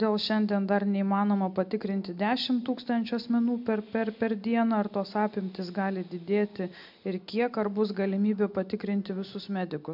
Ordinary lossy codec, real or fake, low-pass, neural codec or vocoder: MP3, 48 kbps; real; 5.4 kHz; none